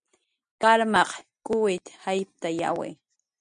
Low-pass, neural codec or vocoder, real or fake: 9.9 kHz; none; real